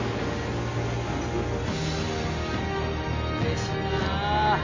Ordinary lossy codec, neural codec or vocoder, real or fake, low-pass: AAC, 32 kbps; none; real; 7.2 kHz